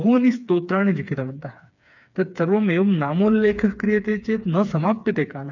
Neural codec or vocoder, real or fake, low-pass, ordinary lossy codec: codec, 16 kHz, 4 kbps, FreqCodec, smaller model; fake; 7.2 kHz; none